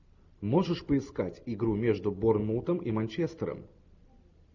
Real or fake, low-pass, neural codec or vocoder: real; 7.2 kHz; none